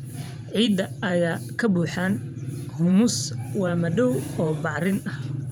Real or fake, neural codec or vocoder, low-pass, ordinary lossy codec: fake; vocoder, 44.1 kHz, 128 mel bands every 512 samples, BigVGAN v2; none; none